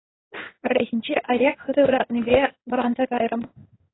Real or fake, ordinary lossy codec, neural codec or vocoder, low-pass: fake; AAC, 16 kbps; codec, 16 kHz in and 24 kHz out, 1 kbps, XY-Tokenizer; 7.2 kHz